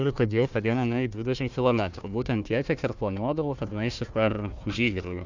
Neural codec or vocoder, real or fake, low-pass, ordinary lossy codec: codec, 16 kHz, 1 kbps, FunCodec, trained on Chinese and English, 50 frames a second; fake; 7.2 kHz; Opus, 64 kbps